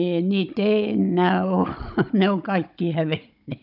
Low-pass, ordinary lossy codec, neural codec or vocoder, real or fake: 5.4 kHz; none; codec, 16 kHz, 16 kbps, FunCodec, trained on Chinese and English, 50 frames a second; fake